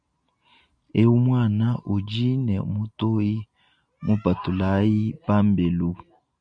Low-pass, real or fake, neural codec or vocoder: 9.9 kHz; real; none